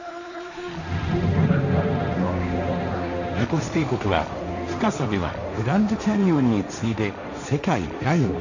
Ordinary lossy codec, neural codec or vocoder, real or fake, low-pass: none; codec, 16 kHz, 1.1 kbps, Voila-Tokenizer; fake; 7.2 kHz